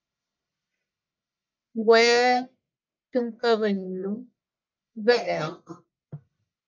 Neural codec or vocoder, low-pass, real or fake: codec, 44.1 kHz, 1.7 kbps, Pupu-Codec; 7.2 kHz; fake